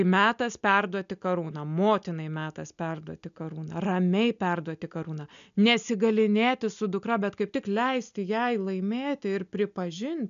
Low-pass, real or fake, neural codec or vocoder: 7.2 kHz; real; none